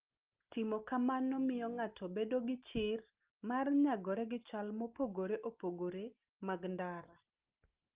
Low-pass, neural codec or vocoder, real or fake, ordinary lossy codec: 3.6 kHz; none; real; Opus, 24 kbps